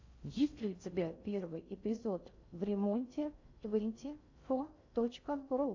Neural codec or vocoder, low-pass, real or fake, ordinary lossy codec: codec, 16 kHz in and 24 kHz out, 0.6 kbps, FocalCodec, streaming, 4096 codes; 7.2 kHz; fake; AAC, 48 kbps